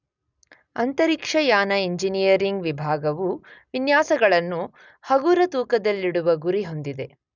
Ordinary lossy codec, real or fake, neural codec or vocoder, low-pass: none; real; none; 7.2 kHz